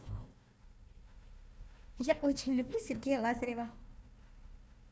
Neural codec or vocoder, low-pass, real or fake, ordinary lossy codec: codec, 16 kHz, 1 kbps, FunCodec, trained on Chinese and English, 50 frames a second; none; fake; none